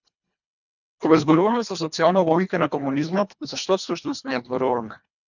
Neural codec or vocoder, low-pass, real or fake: codec, 24 kHz, 1.5 kbps, HILCodec; 7.2 kHz; fake